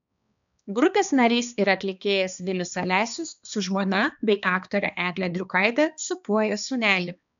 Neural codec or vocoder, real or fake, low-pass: codec, 16 kHz, 2 kbps, X-Codec, HuBERT features, trained on balanced general audio; fake; 7.2 kHz